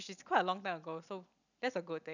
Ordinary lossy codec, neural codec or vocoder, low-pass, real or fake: none; none; 7.2 kHz; real